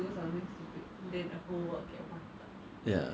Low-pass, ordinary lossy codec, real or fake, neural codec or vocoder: none; none; real; none